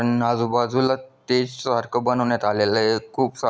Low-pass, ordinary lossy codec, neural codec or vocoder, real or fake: none; none; none; real